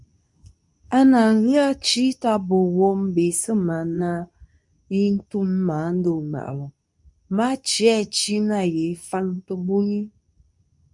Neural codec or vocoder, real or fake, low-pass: codec, 24 kHz, 0.9 kbps, WavTokenizer, medium speech release version 2; fake; 10.8 kHz